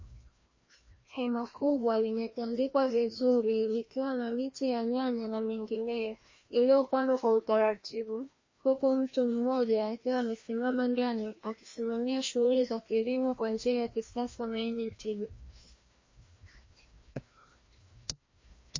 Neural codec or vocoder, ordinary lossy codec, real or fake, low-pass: codec, 16 kHz, 1 kbps, FreqCodec, larger model; MP3, 32 kbps; fake; 7.2 kHz